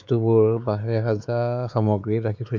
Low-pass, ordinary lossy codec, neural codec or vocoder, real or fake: none; none; codec, 16 kHz, 4 kbps, X-Codec, WavLM features, trained on Multilingual LibriSpeech; fake